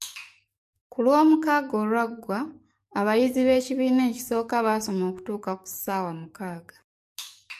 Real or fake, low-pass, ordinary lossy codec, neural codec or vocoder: fake; 14.4 kHz; MP3, 64 kbps; codec, 44.1 kHz, 7.8 kbps, DAC